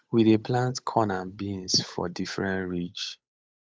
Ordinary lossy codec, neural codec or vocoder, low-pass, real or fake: none; codec, 16 kHz, 8 kbps, FunCodec, trained on Chinese and English, 25 frames a second; none; fake